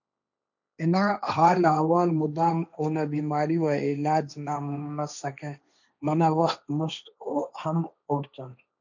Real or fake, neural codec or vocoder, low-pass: fake; codec, 16 kHz, 1.1 kbps, Voila-Tokenizer; 7.2 kHz